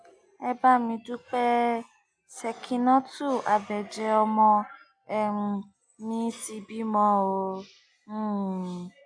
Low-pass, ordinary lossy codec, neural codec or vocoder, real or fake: 9.9 kHz; AAC, 64 kbps; none; real